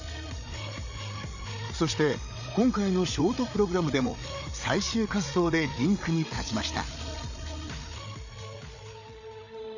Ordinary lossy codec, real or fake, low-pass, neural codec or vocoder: none; fake; 7.2 kHz; codec, 16 kHz, 8 kbps, FreqCodec, larger model